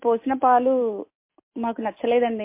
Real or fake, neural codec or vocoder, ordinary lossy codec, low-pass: real; none; MP3, 24 kbps; 3.6 kHz